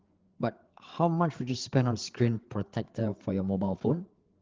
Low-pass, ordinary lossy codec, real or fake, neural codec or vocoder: 7.2 kHz; Opus, 16 kbps; fake; codec, 16 kHz, 8 kbps, FreqCodec, larger model